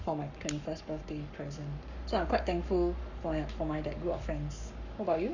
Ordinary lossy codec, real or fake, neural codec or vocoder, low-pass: none; fake; codec, 44.1 kHz, 7.8 kbps, Pupu-Codec; 7.2 kHz